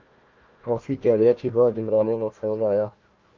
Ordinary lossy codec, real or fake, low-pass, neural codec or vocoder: Opus, 24 kbps; fake; 7.2 kHz; codec, 16 kHz, 1 kbps, FunCodec, trained on Chinese and English, 50 frames a second